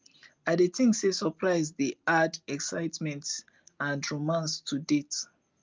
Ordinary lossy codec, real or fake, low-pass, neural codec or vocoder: Opus, 24 kbps; real; 7.2 kHz; none